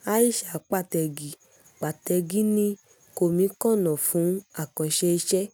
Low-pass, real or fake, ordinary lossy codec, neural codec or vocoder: none; real; none; none